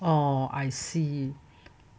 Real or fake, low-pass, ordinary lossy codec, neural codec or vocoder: real; none; none; none